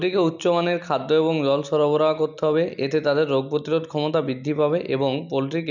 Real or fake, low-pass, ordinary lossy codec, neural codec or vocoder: real; 7.2 kHz; none; none